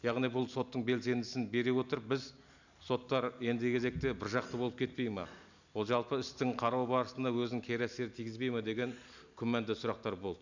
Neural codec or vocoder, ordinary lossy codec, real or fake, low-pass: none; none; real; 7.2 kHz